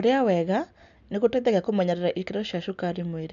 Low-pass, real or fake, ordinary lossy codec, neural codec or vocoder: 7.2 kHz; real; none; none